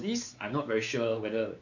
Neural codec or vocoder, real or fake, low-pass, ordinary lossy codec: codec, 16 kHz, 4 kbps, X-Codec, WavLM features, trained on Multilingual LibriSpeech; fake; 7.2 kHz; none